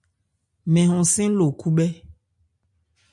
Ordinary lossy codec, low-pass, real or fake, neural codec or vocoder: MP3, 96 kbps; 10.8 kHz; real; none